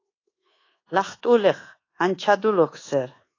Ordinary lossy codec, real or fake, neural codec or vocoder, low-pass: AAC, 32 kbps; fake; codec, 24 kHz, 1.2 kbps, DualCodec; 7.2 kHz